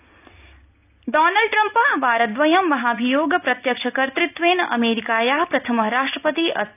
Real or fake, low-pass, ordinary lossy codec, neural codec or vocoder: real; 3.6 kHz; none; none